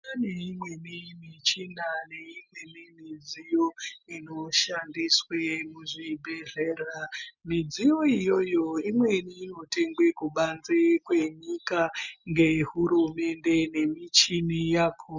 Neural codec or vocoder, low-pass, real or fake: none; 7.2 kHz; real